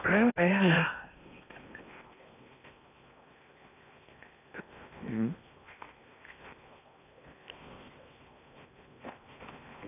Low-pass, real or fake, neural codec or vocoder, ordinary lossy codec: 3.6 kHz; fake; codec, 16 kHz in and 24 kHz out, 0.8 kbps, FocalCodec, streaming, 65536 codes; none